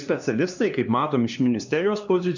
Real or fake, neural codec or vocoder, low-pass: fake; codec, 16 kHz, 2 kbps, X-Codec, HuBERT features, trained on LibriSpeech; 7.2 kHz